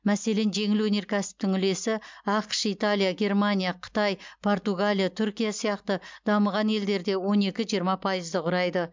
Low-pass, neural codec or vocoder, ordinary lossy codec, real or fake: 7.2 kHz; none; MP3, 64 kbps; real